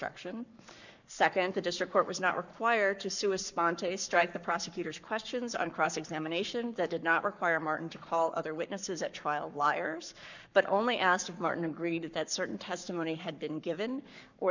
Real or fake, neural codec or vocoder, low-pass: fake; codec, 44.1 kHz, 7.8 kbps, Pupu-Codec; 7.2 kHz